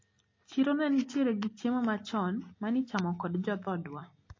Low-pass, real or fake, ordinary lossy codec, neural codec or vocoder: 7.2 kHz; real; MP3, 32 kbps; none